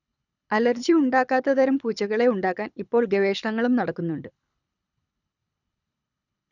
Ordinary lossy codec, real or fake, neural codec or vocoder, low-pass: none; fake; codec, 24 kHz, 6 kbps, HILCodec; 7.2 kHz